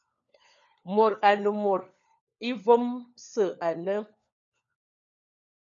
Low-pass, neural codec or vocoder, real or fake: 7.2 kHz; codec, 16 kHz, 4 kbps, FunCodec, trained on LibriTTS, 50 frames a second; fake